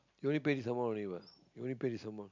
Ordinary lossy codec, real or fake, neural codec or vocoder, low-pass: MP3, 64 kbps; real; none; 7.2 kHz